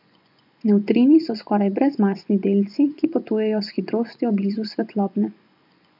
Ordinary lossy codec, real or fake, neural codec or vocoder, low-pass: none; fake; vocoder, 24 kHz, 100 mel bands, Vocos; 5.4 kHz